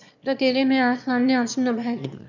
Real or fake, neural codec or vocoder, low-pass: fake; autoencoder, 22.05 kHz, a latent of 192 numbers a frame, VITS, trained on one speaker; 7.2 kHz